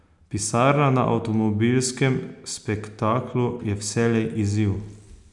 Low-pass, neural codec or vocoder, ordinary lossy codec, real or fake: 10.8 kHz; none; none; real